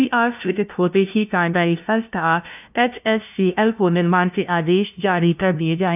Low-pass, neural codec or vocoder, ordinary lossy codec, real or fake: 3.6 kHz; codec, 16 kHz, 0.5 kbps, FunCodec, trained on LibriTTS, 25 frames a second; none; fake